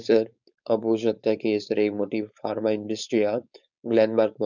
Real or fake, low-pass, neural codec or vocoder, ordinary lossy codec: fake; 7.2 kHz; codec, 16 kHz, 4.8 kbps, FACodec; none